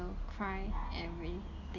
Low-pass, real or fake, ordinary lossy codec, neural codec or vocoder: 7.2 kHz; real; none; none